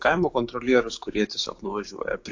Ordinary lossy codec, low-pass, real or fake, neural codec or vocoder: AAC, 48 kbps; 7.2 kHz; fake; codec, 16 kHz, 6 kbps, DAC